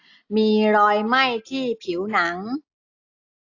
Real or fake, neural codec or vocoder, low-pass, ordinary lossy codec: real; none; 7.2 kHz; AAC, 48 kbps